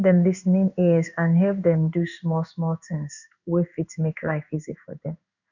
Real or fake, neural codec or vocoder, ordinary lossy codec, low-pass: fake; codec, 16 kHz in and 24 kHz out, 1 kbps, XY-Tokenizer; none; 7.2 kHz